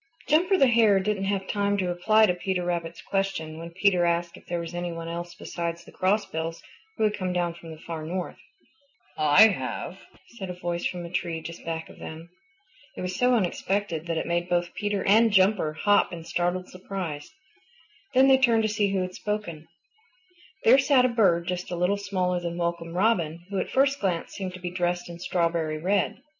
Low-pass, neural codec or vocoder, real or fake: 7.2 kHz; none; real